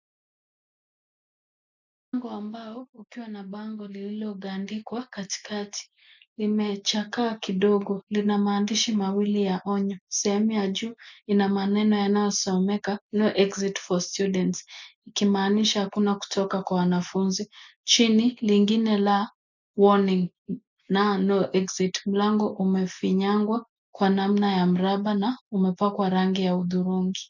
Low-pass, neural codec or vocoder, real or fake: 7.2 kHz; none; real